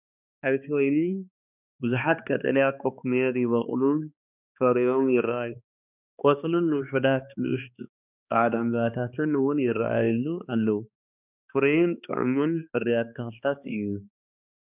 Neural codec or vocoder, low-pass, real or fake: codec, 16 kHz, 2 kbps, X-Codec, HuBERT features, trained on balanced general audio; 3.6 kHz; fake